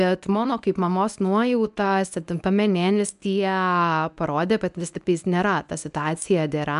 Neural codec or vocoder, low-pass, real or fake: codec, 24 kHz, 0.9 kbps, WavTokenizer, medium speech release version 1; 10.8 kHz; fake